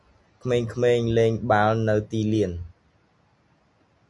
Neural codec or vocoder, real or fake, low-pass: none; real; 10.8 kHz